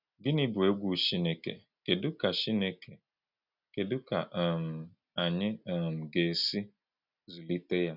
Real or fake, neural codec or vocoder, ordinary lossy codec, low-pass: real; none; none; 5.4 kHz